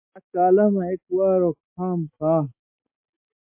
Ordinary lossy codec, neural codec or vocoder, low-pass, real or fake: MP3, 24 kbps; none; 3.6 kHz; real